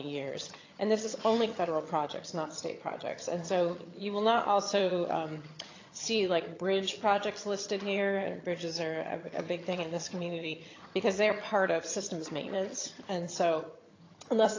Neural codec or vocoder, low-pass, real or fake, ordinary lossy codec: vocoder, 22.05 kHz, 80 mel bands, HiFi-GAN; 7.2 kHz; fake; AAC, 32 kbps